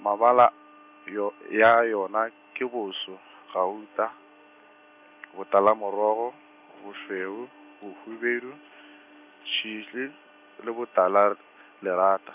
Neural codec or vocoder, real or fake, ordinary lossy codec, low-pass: none; real; none; 3.6 kHz